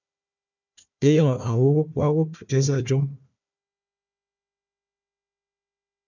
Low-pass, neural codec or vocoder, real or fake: 7.2 kHz; codec, 16 kHz, 1 kbps, FunCodec, trained on Chinese and English, 50 frames a second; fake